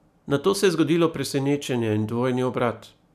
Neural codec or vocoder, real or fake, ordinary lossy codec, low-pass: none; real; none; 14.4 kHz